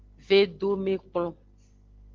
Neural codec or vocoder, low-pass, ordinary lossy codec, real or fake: none; 7.2 kHz; Opus, 16 kbps; real